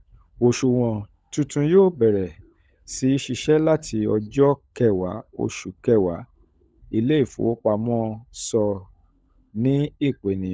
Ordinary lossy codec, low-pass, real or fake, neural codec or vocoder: none; none; fake; codec, 16 kHz, 16 kbps, FunCodec, trained on LibriTTS, 50 frames a second